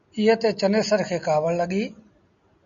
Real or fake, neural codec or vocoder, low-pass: real; none; 7.2 kHz